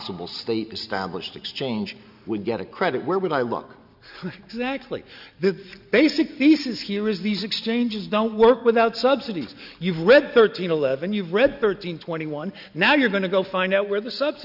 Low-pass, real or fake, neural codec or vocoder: 5.4 kHz; real; none